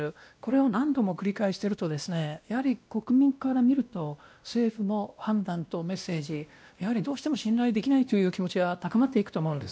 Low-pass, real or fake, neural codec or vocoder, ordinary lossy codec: none; fake; codec, 16 kHz, 1 kbps, X-Codec, WavLM features, trained on Multilingual LibriSpeech; none